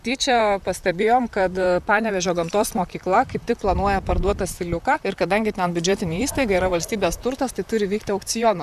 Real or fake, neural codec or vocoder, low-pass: fake; vocoder, 44.1 kHz, 128 mel bands, Pupu-Vocoder; 14.4 kHz